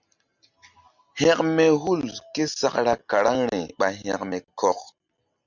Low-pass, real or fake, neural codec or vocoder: 7.2 kHz; real; none